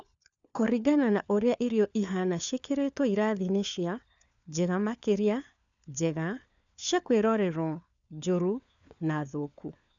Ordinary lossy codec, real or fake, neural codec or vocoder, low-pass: none; fake; codec, 16 kHz, 4 kbps, FunCodec, trained on LibriTTS, 50 frames a second; 7.2 kHz